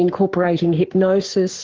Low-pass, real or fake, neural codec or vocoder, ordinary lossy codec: 7.2 kHz; fake; codec, 44.1 kHz, 7.8 kbps, Pupu-Codec; Opus, 16 kbps